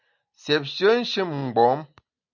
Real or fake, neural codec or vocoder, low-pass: real; none; 7.2 kHz